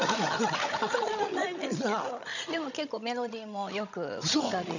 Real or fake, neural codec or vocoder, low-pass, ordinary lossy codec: fake; codec, 16 kHz, 16 kbps, FreqCodec, larger model; 7.2 kHz; none